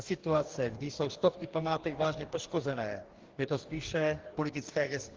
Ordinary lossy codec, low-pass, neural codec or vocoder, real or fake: Opus, 16 kbps; 7.2 kHz; codec, 44.1 kHz, 2.6 kbps, DAC; fake